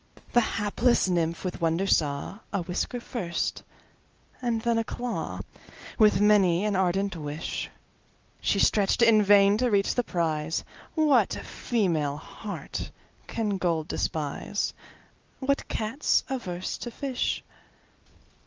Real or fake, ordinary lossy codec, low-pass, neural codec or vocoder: real; Opus, 24 kbps; 7.2 kHz; none